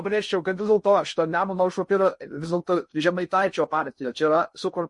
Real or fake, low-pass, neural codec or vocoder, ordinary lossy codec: fake; 10.8 kHz; codec, 16 kHz in and 24 kHz out, 0.6 kbps, FocalCodec, streaming, 2048 codes; MP3, 48 kbps